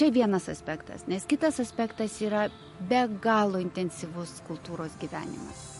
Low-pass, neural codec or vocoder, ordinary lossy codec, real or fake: 14.4 kHz; none; MP3, 48 kbps; real